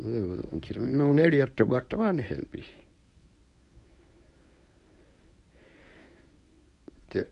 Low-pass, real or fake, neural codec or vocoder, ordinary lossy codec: 10.8 kHz; fake; codec, 24 kHz, 0.9 kbps, WavTokenizer, medium speech release version 1; none